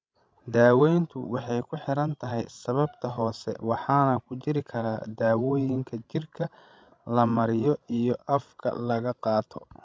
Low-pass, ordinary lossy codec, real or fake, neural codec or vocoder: none; none; fake; codec, 16 kHz, 16 kbps, FreqCodec, larger model